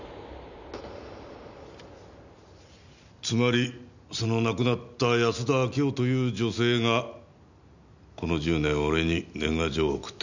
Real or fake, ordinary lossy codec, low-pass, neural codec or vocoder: real; none; 7.2 kHz; none